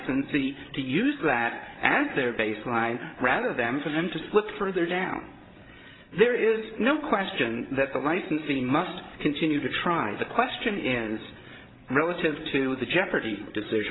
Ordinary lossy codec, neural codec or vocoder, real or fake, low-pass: AAC, 16 kbps; codec, 16 kHz, 16 kbps, FreqCodec, larger model; fake; 7.2 kHz